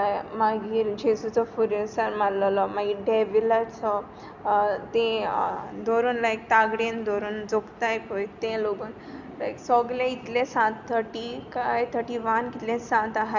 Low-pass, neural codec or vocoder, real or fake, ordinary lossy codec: 7.2 kHz; none; real; none